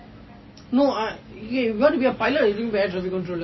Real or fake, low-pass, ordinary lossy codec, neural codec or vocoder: real; 7.2 kHz; MP3, 24 kbps; none